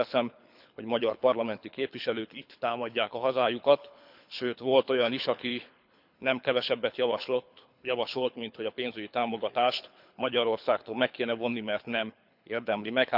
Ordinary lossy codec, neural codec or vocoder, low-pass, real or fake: none; codec, 24 kHz, 6 kbps, HILCodec; 5.4 kHz; fake